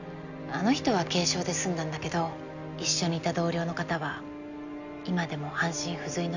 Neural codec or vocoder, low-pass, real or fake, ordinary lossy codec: none; 7.2 kHz; real; none